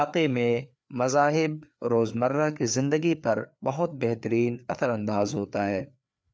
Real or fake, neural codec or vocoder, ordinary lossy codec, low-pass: fake; codec, 16 kHz, 4 kbps, FreqCodec, larger model; none; none